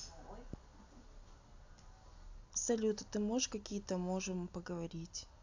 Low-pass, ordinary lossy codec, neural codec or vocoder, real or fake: 7.2 kHz; AAC, 48 kbps; none; real